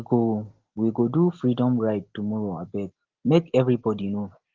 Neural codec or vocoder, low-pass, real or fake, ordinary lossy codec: none; 7.2 kHz; real; Opus, 16 kbps